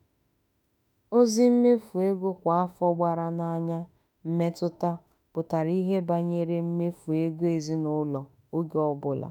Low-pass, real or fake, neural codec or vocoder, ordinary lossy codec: none; fake; autoencoder, 48 kHz, 32 numbers a frame, DAC-VAE, trained on Japanese speech; none